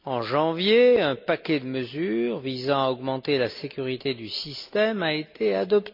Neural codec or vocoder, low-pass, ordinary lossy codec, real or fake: none; 5.4 kHz; MP3, 48 kbps; real